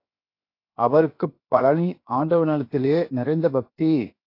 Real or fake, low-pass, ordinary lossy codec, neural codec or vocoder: fake; 5.4 kHz; AAC, 32 kbps; codec, 16 kHz, 0.7 kbps, FocalCodec